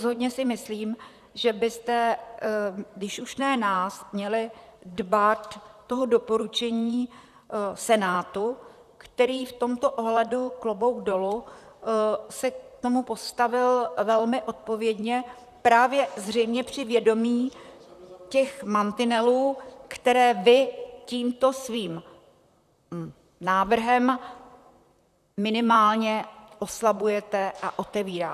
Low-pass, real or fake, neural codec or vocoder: 14.4 kHz; fake; vocoder, 44.1 kHz, 128 mel bands, Pupu-Vocoder